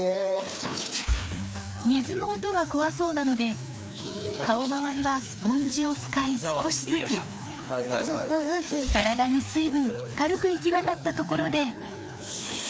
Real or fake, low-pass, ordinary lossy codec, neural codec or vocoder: fake; none; none; codec, 16 kHz, 2 kbps, FreqCodec, larger model